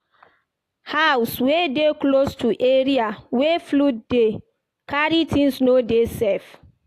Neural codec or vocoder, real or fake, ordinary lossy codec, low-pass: none; real; AAC, 64 kbps; 14.4 kHz